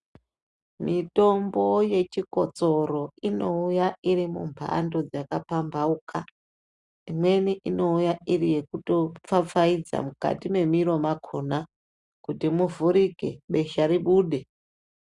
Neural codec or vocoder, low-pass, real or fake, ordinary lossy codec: none; 10.8 kHz; real; Opus, 64 kbps